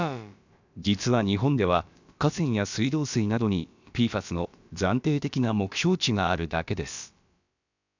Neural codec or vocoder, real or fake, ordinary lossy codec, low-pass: codec, 16 kHz, about 1 kbps, DyCAST, with the encoder's durations; fake; none; 7.2 kHz